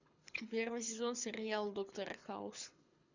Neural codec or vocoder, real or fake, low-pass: codec, 24 kHz, 6 kbps, HILCodec; fake; 7.2 kHz